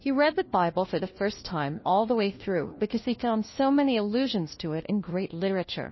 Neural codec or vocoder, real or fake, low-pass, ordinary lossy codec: codec, 16 kHz, 1 kbps, FunCodec, trained on LibriTTS, 50 frames a second; fake; 7.2 kHz; MP3, 24 kbps